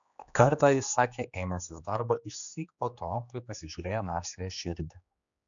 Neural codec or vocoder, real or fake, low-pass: codec, 16 kHz, 2 kbps, X-Codec, HuBERT features, trained on general audio; fake; 7.2 kHz